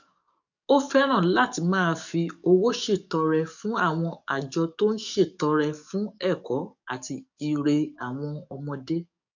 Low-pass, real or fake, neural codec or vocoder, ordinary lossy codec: 7.2 kHz; fake; codec, 44.1 kHz, 7.8 kbps, DAC; none